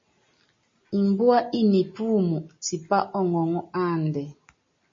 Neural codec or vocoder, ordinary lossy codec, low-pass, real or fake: none; MP3, 32 kbps; 7.2 kHz; real